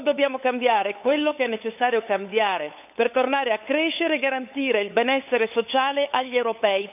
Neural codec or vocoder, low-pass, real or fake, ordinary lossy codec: codec, 16 kHz, 8 kbps, FunCodec, trained on LibriTTS, 25 frames a second; 3.6 kHz; fake; none